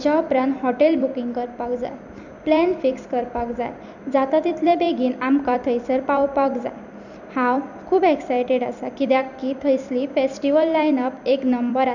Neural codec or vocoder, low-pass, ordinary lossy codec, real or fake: vocoder, 44.1 kHz, 128 mel bands every 256 samples, BigVGAN v2; 7.2 kHz; none; fake